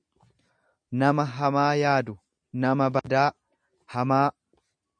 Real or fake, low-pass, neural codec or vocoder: real; 9.9 kHz; none